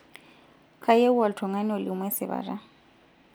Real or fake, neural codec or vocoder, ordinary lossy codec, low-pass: real; none; none; none